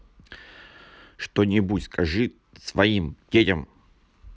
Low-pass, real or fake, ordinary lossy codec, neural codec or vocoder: none; real; none; none